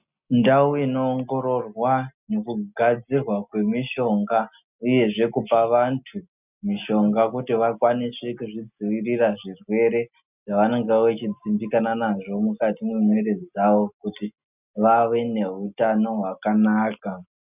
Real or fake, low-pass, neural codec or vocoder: real; 3.6 kHz; none